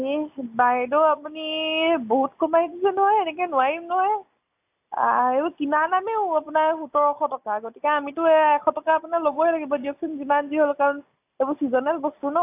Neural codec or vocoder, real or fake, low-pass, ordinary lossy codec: none; real; 3.6 kHz; AAC, 32 kbps